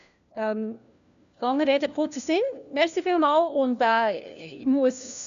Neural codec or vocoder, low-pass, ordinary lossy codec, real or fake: codec, 16 kHz, 1 kbps, FunCodec, trained on LibriTTS, 50 frames a second; 7.2 kHz; none; fake